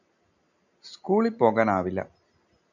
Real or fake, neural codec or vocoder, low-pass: real; none; 7.2 kHz